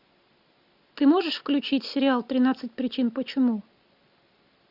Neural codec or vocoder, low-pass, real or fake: none; 5.4 kHz; real